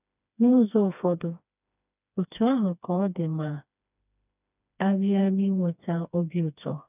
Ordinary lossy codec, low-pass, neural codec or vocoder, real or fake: none; 3.6 kHz; codec, 16 kHz, 2 kbps, FreqCodec, smaller model; fake